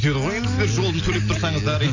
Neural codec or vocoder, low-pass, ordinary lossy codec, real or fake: none; 7.2 kHz; none; real